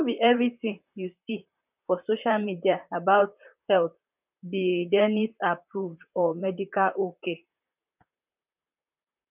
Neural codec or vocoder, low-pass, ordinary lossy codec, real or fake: vocoder, 44.1 kHz, 128 mel bands, Pupu-Vocoder; 3.6 kHz; none; fake